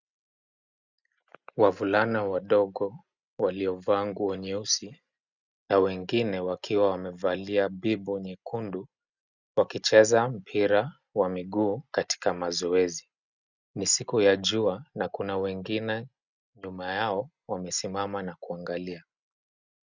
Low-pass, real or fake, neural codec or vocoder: 7.2 kHz; real; none